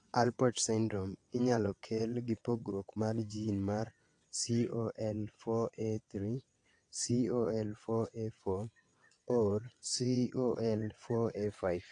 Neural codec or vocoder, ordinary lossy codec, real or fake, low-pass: vocoder, 22.05 kHz, 80 mel bands, WaveNeXt; AAC, 48 kbps; fake; 9.9 kHz